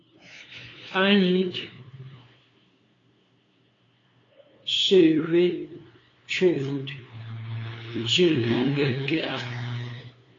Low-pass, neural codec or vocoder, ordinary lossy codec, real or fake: 7.2 kHz; codec, 16 kHz, 2 kbps, FunCodec, trained on LibriTTS, 25 frames a second; AAC, 32 kbps; fake